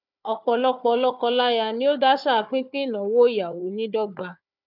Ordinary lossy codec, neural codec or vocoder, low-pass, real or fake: none; codec, 16 kHz, 4 kbps, FunCodec, trained on Chinese and English, 50 frames a second; 5.4 kHz; fake